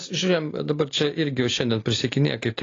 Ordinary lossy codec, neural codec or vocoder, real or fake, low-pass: AAC, 32 kbps; none; real; 7.2 kHz